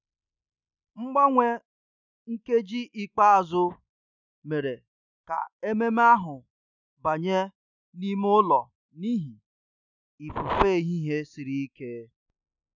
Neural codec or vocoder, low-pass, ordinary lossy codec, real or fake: none; 7.2 kHz; none; real